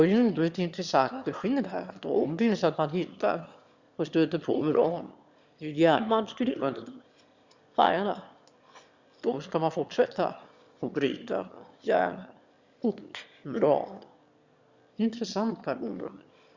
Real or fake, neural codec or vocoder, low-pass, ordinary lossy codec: fake; autoencoder, 22.05 kHz, a latent of 192 numbers a frame, VITS, trained on one speaker; 7.2 kHz; Opus, 64 kbps